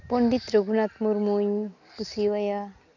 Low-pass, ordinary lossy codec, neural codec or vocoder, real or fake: 7.2 kHz; none; none; real